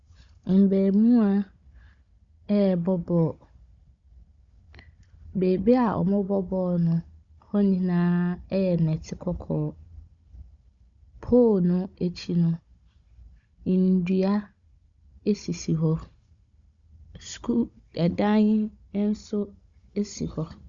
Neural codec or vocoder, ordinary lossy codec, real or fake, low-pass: codec, 16 kHz, 16 kbps, FunCodec, trained on Chinese and English, 50 frames a second; Opus, 64 kbps; fake; 7.2 kHz